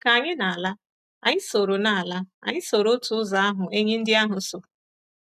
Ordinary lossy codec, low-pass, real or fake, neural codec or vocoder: AAC, 96 kbps; 14.4 kHz; real; none